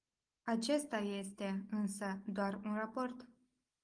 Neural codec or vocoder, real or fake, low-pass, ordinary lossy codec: none; real; 9.9 kHz; Opus, 24 kbps